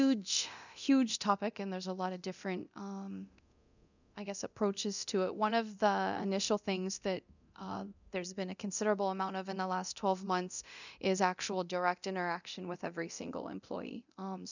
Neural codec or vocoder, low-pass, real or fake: codec, 24 kHz, 0.9 kbps, DualCodec; 7.2 kHz; fake